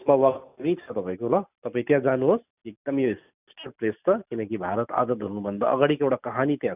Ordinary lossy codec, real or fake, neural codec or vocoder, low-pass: none; real; none; 3.6 kHz